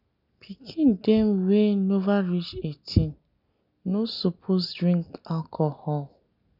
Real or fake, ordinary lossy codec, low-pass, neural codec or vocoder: real; none; 5.4 kHz; none